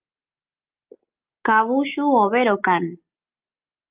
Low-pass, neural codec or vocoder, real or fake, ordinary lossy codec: 3.6 kHz; none; real; Opus, 24 kbps